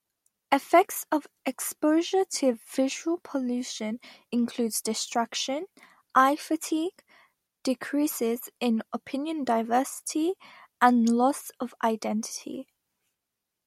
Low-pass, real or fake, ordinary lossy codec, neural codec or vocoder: 19.8 kHz; real; MP3, 64 kbps; none